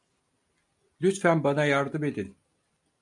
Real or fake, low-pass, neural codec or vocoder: real; 10.8 kHz; none